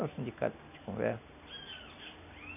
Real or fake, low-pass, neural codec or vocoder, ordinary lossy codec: real; 3.6 kHz; none; none